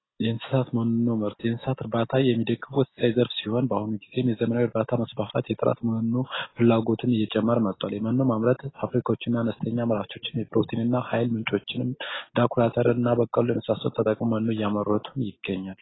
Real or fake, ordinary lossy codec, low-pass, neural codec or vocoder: real; AAC, 16 kbps; 7.2 kHz; none